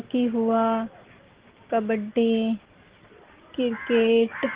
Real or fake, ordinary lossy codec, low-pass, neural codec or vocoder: real; Opus, 16 kbps; 3.6 kHz; none